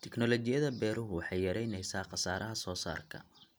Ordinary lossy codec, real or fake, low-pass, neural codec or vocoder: none; real; none; none